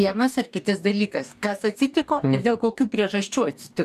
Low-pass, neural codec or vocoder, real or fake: 14.4 kHz; codec, 44.1 kHz, 2.6 kbps, DAC; fake